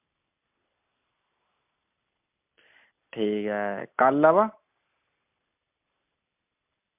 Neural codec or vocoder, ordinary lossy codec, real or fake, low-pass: none; MP3, 32 kbps; real; 3.6 kHz